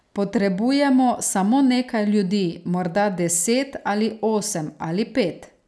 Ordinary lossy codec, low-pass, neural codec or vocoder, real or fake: none; none; none; real